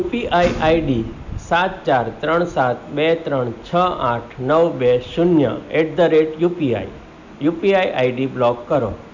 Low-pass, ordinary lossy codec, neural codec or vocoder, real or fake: 7.2 kHz; none; none; real